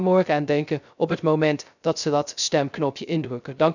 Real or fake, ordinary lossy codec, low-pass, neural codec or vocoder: fake; none; 7.2 kHz; codec, 16 kHz, 0.3 kbps, FocalCodec